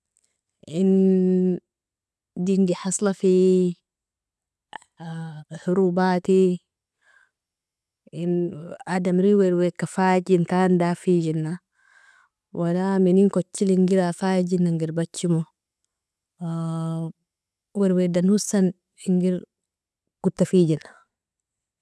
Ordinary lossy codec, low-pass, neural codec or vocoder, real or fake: none; none; none; real